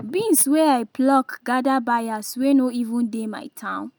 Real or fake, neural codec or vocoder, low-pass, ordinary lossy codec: real; none; none; none